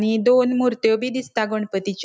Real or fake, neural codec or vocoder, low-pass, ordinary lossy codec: real; none; none; none